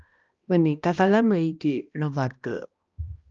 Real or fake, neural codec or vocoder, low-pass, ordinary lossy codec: fake; codec, 16 kHz, 1 kbps, X-Codec, HuBERT features, trained on balanced general audio; 7.2 kHz; Opus, 24 kbps